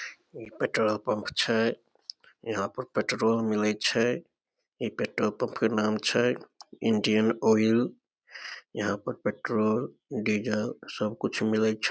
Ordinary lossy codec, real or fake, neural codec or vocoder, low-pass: none; real; none; none